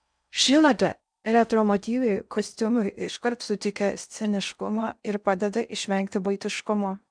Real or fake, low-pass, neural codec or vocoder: fake; 9.9 kHz; codec, 16 kHz in and 24 kHz out, 0.6 kbps, FocalCodec, streaming, 4096 codes